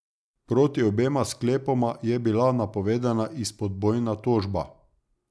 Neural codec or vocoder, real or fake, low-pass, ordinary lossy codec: none; real; none; none